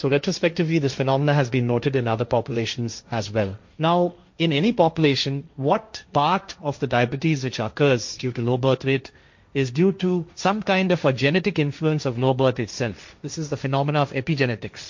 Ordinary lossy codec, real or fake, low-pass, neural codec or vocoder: MP3, 48 kbps; fake; 7.2 kHz; codec, 16 kHz, 1.1 kbps, Voila-Tokenizer